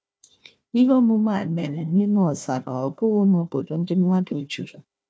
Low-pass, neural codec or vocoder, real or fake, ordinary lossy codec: none; codec, 16 kHz, 1 kbps, FunCodec, trained on Chinese and English, 50 frames a second; fake; none